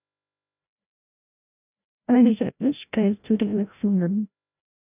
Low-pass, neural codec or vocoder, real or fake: 3.6 kHz; codec, 16 kHz, 0.5 kbps, FreqCodec, larger model; fake